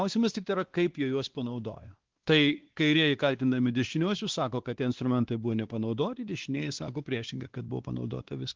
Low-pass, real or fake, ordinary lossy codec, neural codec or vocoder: 7.2 kHz; fake; Opus, 16 kbps; codec, 16 kHz, 2 kbps, X-Codec, WavLM features, trained on Multilingual LibriSpeech